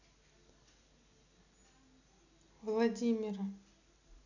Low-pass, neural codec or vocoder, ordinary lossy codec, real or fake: 7.2 kHz; none; none; real